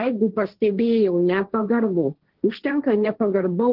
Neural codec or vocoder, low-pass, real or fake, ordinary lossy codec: codec, 16 kHz, 1.1 kbps, Voila-Tokenizer; 5.4 kHz; fake; Opus, 16 kbps